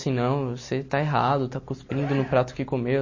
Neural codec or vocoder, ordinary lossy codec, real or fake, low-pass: none; MP3, 32 kbps; real; 7.2 kHz